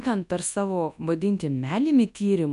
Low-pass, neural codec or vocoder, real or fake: 10.8 kHz; codec, 24 kHz, 0.9 kbps, WavTokenizer, large speech release; fake